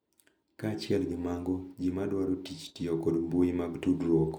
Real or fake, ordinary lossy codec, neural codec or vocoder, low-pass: real; none; none; 19.8 kHz